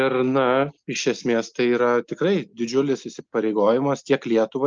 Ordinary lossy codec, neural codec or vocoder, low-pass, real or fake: Opus, 24 kbps; none; 7.2 kHz; real